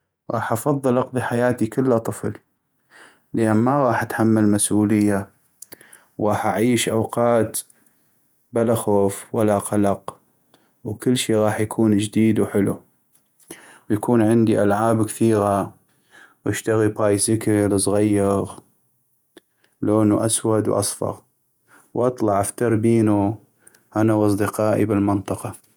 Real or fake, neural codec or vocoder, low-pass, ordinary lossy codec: fake; vocoder, 48 kHz, 128 mel bands, Vocos; none; none